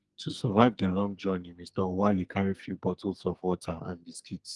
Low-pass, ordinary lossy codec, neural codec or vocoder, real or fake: 10.8 kHz; Opus, 24 kbps; codec, 44.1 kHz, 2.6 kbps, SNAC; fake